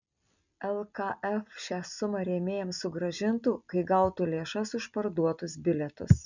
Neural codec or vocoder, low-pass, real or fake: none; 7.2 kHz; real